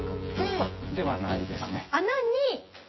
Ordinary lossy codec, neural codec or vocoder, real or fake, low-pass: MP3, 24 kbps; vocoder, 24 kHz, 100 mel bands, Vocos; fake; 7.2 kHz